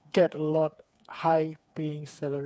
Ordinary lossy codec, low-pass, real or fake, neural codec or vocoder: none; none; fake; codec, 16 kHz, 4 kbps, FreqCodec, smaller model